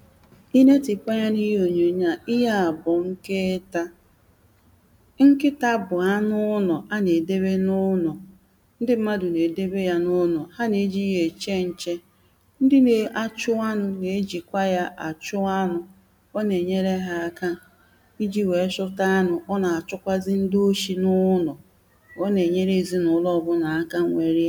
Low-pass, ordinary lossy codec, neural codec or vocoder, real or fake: 19.8 kHz; none; none; real